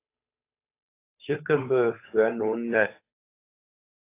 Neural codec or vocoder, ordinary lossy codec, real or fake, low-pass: codec, 16 kHz, 8 kbps, FunCodec, trained on Chinese and English, 25 frames a second; AAC, 24 kbps; fake; 3.6 kHz